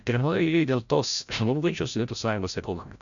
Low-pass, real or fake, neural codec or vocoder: 7.2 kHz; fake; codec, 16 kHz, 0.5 kbps, FreqCodec, larger model